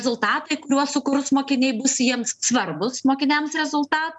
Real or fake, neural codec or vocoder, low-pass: real; none; 10.8 kHz